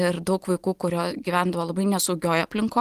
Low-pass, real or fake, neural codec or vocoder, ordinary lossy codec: 14.4 kHz; real; none; Opus, 24 kbps